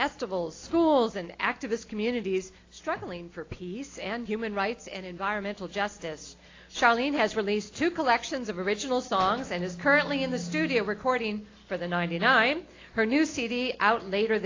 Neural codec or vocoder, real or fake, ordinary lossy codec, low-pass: none; real; AAC, 32 kbps; 7.2 kHz